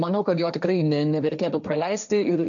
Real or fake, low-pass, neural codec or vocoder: fake; 7.2 kHz; codec, 16 kHz, 1.1 kbps, Voila-Tokenizer